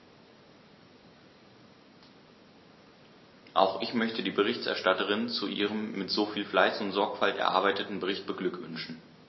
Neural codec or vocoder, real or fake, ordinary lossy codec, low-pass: none; real; MP3, 24 kbps; 7.2 kHz